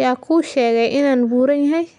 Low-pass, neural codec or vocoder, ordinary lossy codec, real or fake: 10.8 kHz; none; none; real